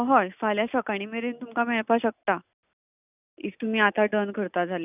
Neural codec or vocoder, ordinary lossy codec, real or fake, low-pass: none; none; real; 3.6 kHz